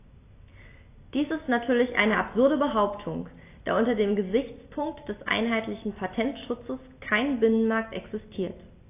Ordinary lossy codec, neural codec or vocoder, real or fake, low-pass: AAC, 24 kbps; none; real; 3.6 kHz